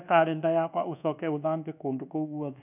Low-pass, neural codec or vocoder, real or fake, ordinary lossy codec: 3.6 kHz; codec, 24 kHz, 1.2 kbps, DualCodec; fake; none